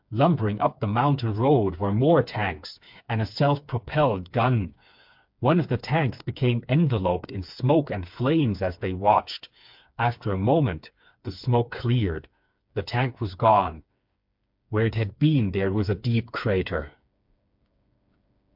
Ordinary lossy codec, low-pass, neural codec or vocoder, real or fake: MP3, 48 kbps; 5.4 kHz; codec, 16 kHz, 4 kbps, FreqCodec, smaller model; fake